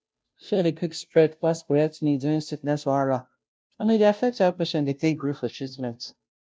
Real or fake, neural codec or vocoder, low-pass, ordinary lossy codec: fake; codec, 16 kHz, 0.5 kbps, FunCodec, trained on Chinese and English, 25 frames a second; none; none